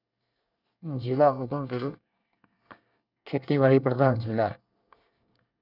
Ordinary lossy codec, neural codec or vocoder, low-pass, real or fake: none; codec, 24 kHz, 1 kbps, SNAC; 5.4 kHz; fake